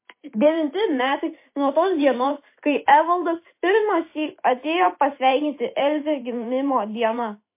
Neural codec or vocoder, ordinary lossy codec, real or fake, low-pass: vocoder, 44.1 kHz, 128 mel bands every 512 samples, BigVGAN v2; MP3, 24 kbps; fake; 3.6 kHz